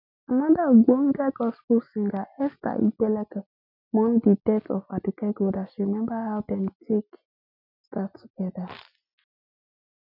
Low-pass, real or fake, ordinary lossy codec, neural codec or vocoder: 5.4 kHz; real; none; none